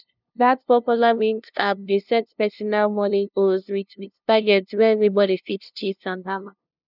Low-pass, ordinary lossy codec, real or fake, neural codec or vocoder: 5.4 kHz; none; fake; codec, 16 kHz, 0.5 kbps, FunCodec, trained on LibriTTS, 25 frames a second